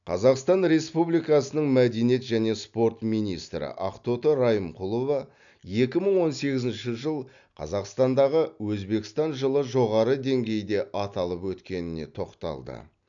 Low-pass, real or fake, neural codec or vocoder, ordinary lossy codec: 7.2 kHz; real; none; none